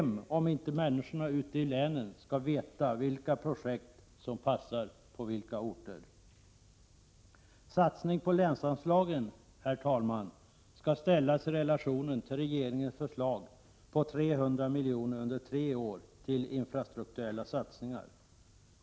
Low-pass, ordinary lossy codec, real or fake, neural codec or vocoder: none; none; real; none